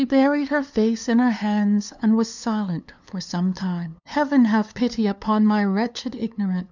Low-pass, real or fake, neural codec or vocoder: 7.2 kHz; fake; codec, 16 kHz, 2 kbps, FunCodec, trained on LibriTTS, 25 frames a second